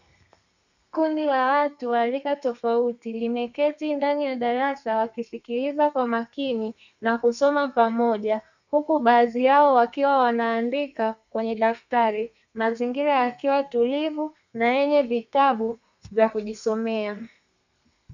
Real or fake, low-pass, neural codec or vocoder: fake; 7.2 kHz; codec, 32 kHz, 1.9 kbps, SNAC